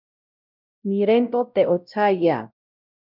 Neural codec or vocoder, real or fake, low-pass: codec, 16 kHz, 0.5 kbps, X-Codec, WavLM features, trained on Multilingual LibriSpeech; fake; 5.4 kHz